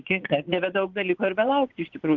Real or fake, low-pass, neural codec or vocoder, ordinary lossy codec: fake; 7.2 kHz; vocoder, 24 kHz, 100 mel bands, Vocos; Opus, 16 kbps